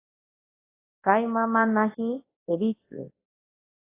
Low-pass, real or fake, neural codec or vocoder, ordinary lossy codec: 3.6 kHz; fake; codec, 24 kHz, 0.9 kbps, WavTokenizer, large speech release; AAC, 16 kbps